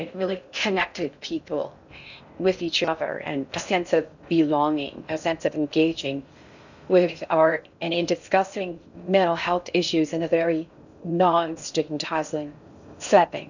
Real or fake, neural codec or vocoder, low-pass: fake; codec, 16 kHz in and 24 kHz out, 0.6 kbps, FocalCodec, streaming, 4096 codes; 7.2 kHz